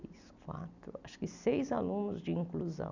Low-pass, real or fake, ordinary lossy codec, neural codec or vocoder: 7.2 kHz; real; none; none